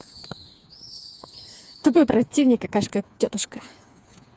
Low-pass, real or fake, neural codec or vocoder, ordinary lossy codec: none; fake; codec, 16 kHz, 4 kbps, FreqCodec, smaller model; none